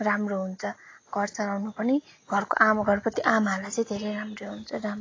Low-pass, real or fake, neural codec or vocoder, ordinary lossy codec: 7.2 kHz; real; none; AAC, 32 kbps